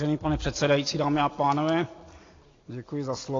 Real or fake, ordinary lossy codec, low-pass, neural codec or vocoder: real; AAC, 32 kbps; 7.2 kHz; none